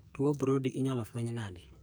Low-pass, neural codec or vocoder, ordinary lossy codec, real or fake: none; codec, 44.1 kHz, 2.6 kbps, SNAC; none; fake